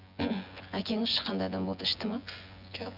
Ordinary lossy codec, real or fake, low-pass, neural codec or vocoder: none; fake; 5.4 kHz; vocoder, 24 kHz, 100 mel bands, Vocos